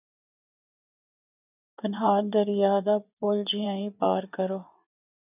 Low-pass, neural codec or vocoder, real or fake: 3.6 kHz; vocoder, 24 kHz, 100 mel bands, Vocos; fake